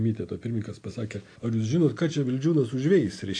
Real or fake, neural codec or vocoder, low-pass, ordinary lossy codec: real; none; 9.9 kHz; AAC, 48 kbps